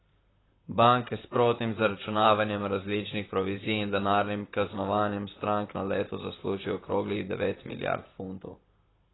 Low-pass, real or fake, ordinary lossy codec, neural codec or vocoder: 7.2 kHz; fake; AAC, 16 kbps; vocoder, 44.1 kHz, 128 mel bands, Pupu-Vocoder